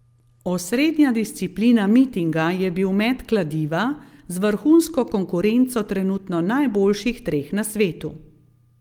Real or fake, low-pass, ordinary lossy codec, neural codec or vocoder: real; 19.8 kHz; Opus, 32 kbps; none